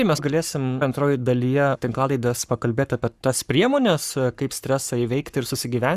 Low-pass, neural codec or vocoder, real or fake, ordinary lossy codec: 14.4 kHz; codec, 44.1 kHz, 7.8 kbps, Pupu-Codec; fake; Opus, 64 kbps